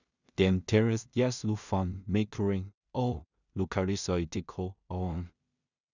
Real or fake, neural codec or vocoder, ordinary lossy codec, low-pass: fake; codec, 16 kHz in and 24 kHz out, 0.4 kbps, LongCat-Audio-Codec, two codebook decoder; none; 7.2 kHz